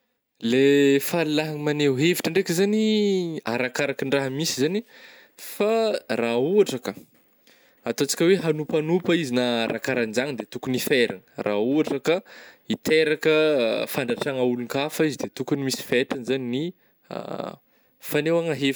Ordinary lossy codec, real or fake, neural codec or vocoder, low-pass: none; real; none; none